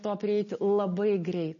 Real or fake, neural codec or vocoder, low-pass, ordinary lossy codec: real; none; 7.2 kHz; MP3, 32 kbps